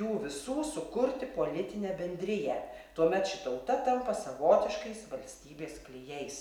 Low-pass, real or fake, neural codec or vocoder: 19.8 kHz; real; none